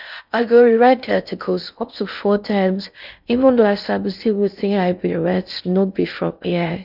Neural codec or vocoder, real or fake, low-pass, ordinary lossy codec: codec, 16 kHz in and 24 kHz out, 0.6 kbps, FocalCodec, streaming, 4096 codes; fake; 5.4 kHz; none